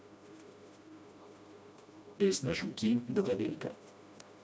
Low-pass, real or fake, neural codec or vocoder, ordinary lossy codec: none; fake; codec, 16 kHz, 1 kbps, FreqCodec, smaller model; none